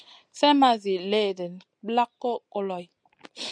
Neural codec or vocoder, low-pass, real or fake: none; 9.9 kHz; real